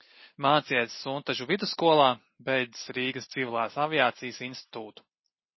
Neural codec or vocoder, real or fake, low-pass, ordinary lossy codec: none; real; 7.2 kHz; MP3, 24 kbps